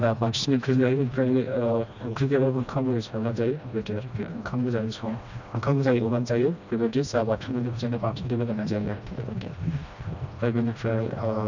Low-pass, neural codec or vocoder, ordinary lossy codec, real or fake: 7.2 kHz; codec, 16 kHz, 1 kbps, FreqCodec, smaller model; none; fake